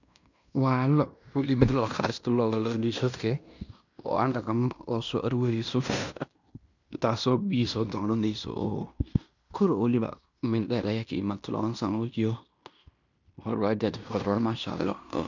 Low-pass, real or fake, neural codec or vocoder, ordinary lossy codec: 7.2 kHz; fake; codec, 16 kHz in and 24 kHz out, 0.9 kbps, LongCat-Audio-Codec, fine tuned four codebook decoder; none